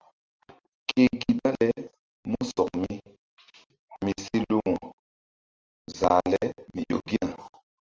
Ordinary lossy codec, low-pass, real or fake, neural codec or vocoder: Opus, 24 kbps; 7.2 kHz; real; none